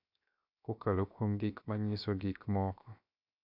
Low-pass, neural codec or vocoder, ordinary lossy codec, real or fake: 5.4 kHz; codec, 16 kHz, 0.7 kbps, FocalCodec; none; fake